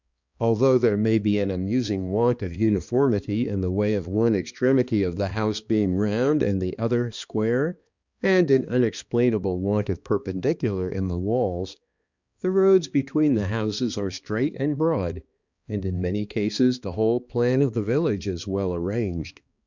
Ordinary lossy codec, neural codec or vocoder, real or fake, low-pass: Opus, 64 kbps; codec, 16 kHz, 2 kbps, X-Codec, HuBERT features, trained on balanced general audio; fake; 7.2 kHz